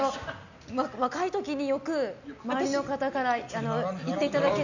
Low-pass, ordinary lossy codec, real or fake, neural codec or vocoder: 7.2 kHz; none; real; none